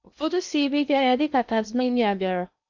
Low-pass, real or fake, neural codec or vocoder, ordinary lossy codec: 7.2 kHz; fake; codec, 16 kHz in and 24 kHz out, 0.8 kbps, FocalCodec, streaming, 65536 codes; none